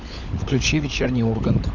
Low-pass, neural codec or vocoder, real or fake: 7.2 kHz; codec, 16 kHz, 16 kbps, FunCodec, trained on LibriTTS, 50 frames a second; fake